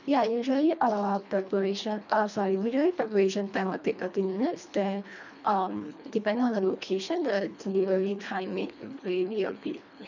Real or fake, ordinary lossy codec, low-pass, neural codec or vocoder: fake; none; 7.2 kHz; codec, 24 kHz, 1.5 kbps, HILCodec